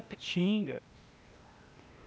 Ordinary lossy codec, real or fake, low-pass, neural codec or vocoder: none; fake; none; codec, 16 kHz, 0.8 kbps, ZipCodec